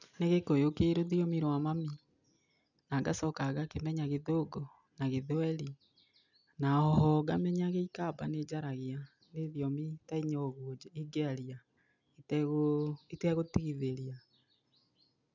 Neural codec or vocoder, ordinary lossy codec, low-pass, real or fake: none; none; 7.2 kHz; real